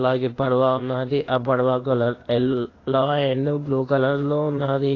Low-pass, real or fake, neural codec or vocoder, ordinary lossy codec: 7.2 kHz; fake; codec, 16 kHz, 0.8 kbps, ZipCodec; AAC, 32 kbps